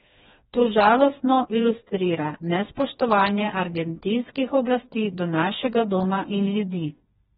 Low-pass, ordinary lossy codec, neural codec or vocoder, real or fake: 7.2 kHz; AAC, 16 kbps; codec, 16 kHz, 2 kbps, FreqCodec, smaller model; fake